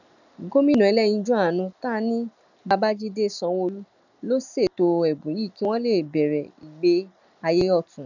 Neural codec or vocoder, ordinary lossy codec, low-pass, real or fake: none; none; 7.2 kHz; real